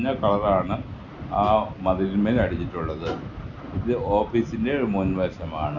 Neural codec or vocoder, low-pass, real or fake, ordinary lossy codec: none; 7.2 kHz; real; none